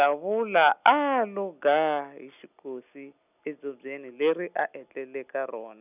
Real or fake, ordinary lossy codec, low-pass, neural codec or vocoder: real; none; 3.6 kHz; none